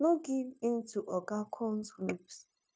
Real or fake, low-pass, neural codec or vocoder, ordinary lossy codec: fake; none; codec, 16 kHz, 0.9 kbps, LongCat-Audio-Codec; none